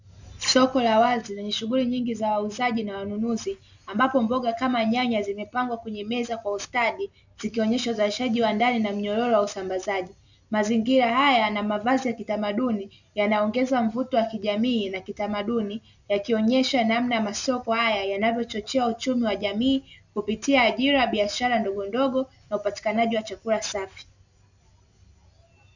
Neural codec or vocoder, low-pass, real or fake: none; 7.2 kHz; real